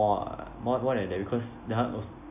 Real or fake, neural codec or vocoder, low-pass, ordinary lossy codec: real; none; 3.6 kHz; AAC, 32 kbps